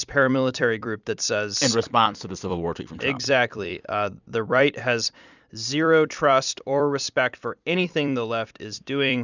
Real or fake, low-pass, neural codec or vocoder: fake; 7.2 kHz; vocoder, 44.1 kHz, 128 mel bands every 256 samples, BigVGAN v2